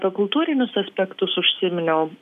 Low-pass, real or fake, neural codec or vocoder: 14.4 kHz; real; none